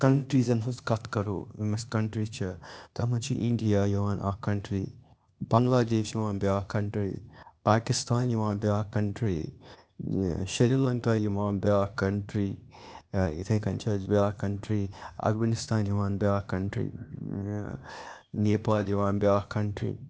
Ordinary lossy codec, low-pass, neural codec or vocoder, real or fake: none; none; codec, 16 kHz, 0.8 kbps, ZipCodec; fake